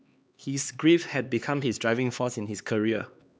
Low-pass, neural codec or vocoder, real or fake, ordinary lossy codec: none; codec, 16 kHz, 2 kbps, X-Codec, HuBERT features, trained on LibriSpeech; fake; none